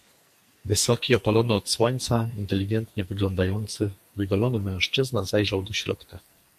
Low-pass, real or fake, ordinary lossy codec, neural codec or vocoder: 14.4 kHz; fake; MP3, 64 kbps; codec, 44.1 kHz, 2.6 kbps, SNAC